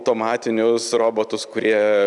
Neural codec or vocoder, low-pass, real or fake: none; 10.8 kHz; real